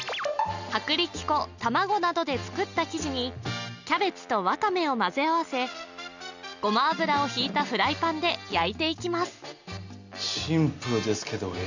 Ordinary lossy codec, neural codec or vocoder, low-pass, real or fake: none; none; 7.2 kHz; real